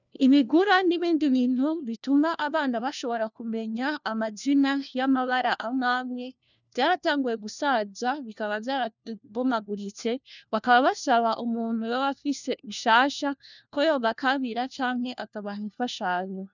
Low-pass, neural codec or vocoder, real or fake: 7.2 kHz; codec, 16 kHz, 1 kbps, FunCodec, trained on LibriTTS, 50 frames a second; fake